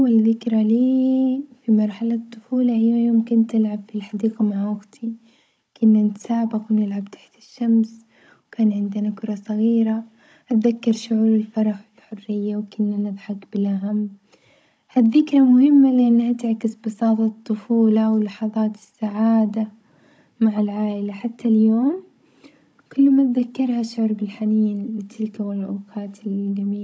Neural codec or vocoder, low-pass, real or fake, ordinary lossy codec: codec, 16 kHz, 16 kbps, FunCodec, trained on Chinese and English, 50 frames a second; none; fake; none